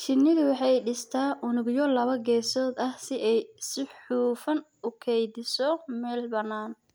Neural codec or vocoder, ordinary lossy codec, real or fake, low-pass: none; none; real; none